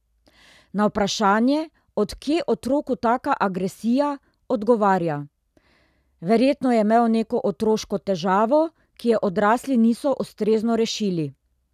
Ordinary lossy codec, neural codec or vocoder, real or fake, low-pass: none; none; real; 14.4 kHz